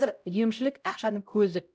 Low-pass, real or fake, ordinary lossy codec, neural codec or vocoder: none; fake; none; codec, 16 kHz, 0.5 kbps, X-Codec, HuBERT features, trained on LibriSpeech